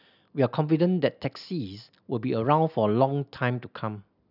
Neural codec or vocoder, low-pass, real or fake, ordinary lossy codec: none; 5.4 kHz; real; none